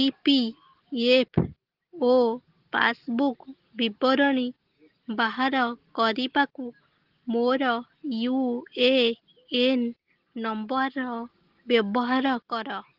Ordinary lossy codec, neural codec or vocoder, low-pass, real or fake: Opus, 16 kbps; none; 5.4 kHz; real